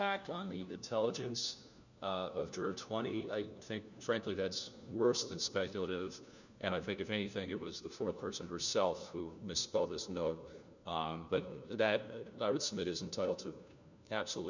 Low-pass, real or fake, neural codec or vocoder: 7.2 kHz; fake; codec, 16 kHz, 1 kbps, FunCodec, trained on LibriTTS, 50 frames a second